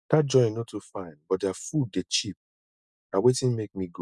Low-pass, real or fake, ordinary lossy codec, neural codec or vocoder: none; real; none; none